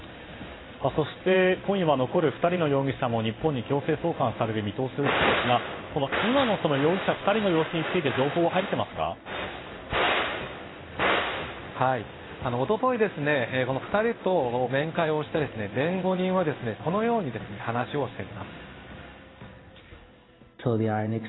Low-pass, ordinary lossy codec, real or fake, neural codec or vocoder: 7.2 kHz; AAC, 16 kbps; fake; codec, 16 kHz in and 24 kHz out, 1 kbps, XY-Tokenizer